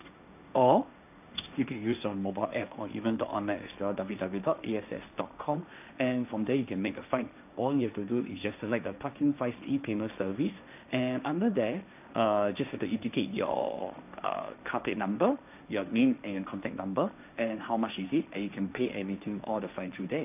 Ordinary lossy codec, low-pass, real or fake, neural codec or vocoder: none; 3.6 kHz; fake; codec, 16 kHz, 1.1 kbps, Voila-Tokenizer